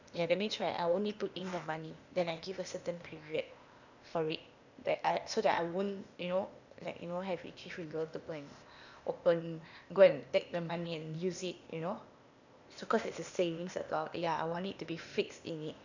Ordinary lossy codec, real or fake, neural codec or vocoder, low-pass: none; fake; codec, 16 kHz, 0.8 kbps, ZipCodec; 7.2 kHz